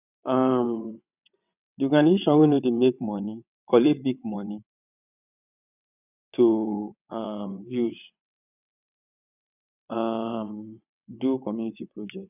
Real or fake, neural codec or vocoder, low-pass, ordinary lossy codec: fake; vocoder, 22.05 kHz, 80 mel bands, WaveNeXt; 3.6 kHz; none